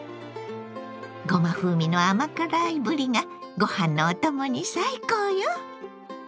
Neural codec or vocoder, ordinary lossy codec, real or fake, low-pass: none; none; real; none